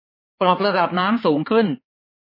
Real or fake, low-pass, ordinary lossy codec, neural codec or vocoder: fake; 5.4 kHz; MP3, 24 kbps; codec, 16 kHz, 4 kbps, X-Codec, HuBERT features, trained on balanced general audio